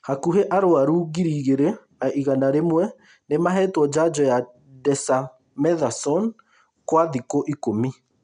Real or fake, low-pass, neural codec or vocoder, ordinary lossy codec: real; 9.9 kHz; none; none